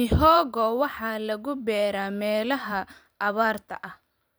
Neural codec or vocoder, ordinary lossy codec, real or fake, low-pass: vocoder, 44.1 kHz, 128 mel bands every 512 samples, BigVGAN v2; none; fake; none